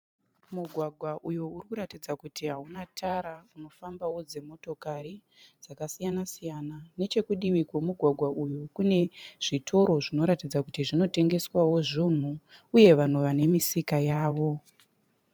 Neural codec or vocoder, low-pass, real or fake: vocoder, 44.1 kHz, 128 mel bands every 512 samples, BigVGAN v2; 19.8 kHz; fake